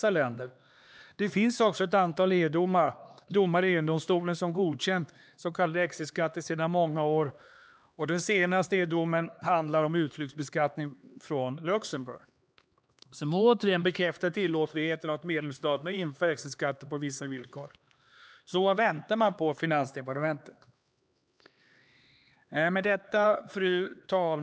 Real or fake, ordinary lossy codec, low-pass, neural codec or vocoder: fake; none; none; codec, 16 kHz, 2 kbps, X-Codec, HuBERT features, trained on LibriSpeech